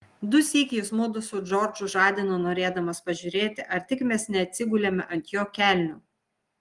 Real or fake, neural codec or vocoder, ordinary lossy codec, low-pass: real; none; Opus, 24 kbps; 10.8 kHz